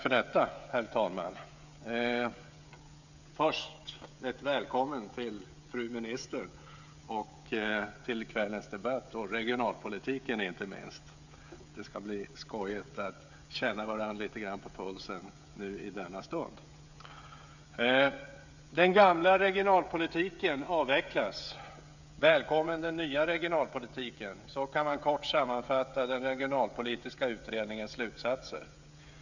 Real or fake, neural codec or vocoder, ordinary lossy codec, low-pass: fake; codec, 16 kHz, 16 kbps, FreqCodec, smaller model; none; 7.2 kHz